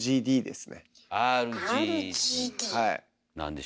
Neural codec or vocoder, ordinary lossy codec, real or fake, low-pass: none; none; real; none